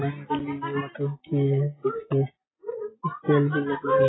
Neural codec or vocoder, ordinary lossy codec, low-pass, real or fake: none; AAC, 16 kbps; 7.2 kHz; real